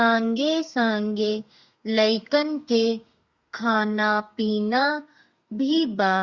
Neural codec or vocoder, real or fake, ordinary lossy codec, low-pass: codec, 32 kHz, 1.9 kbps, SNAC; fake; Opus, 64 kbps; 7.2 kHz